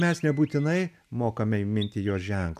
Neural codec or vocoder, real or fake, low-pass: none; real; 14.4 kHz